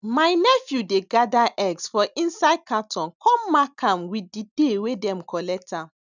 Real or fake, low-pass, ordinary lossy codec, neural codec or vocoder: real; 7.2 kHz; none; none